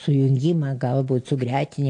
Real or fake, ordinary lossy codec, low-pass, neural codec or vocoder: real; AAC, 48 kbps; 9.9 kHz; none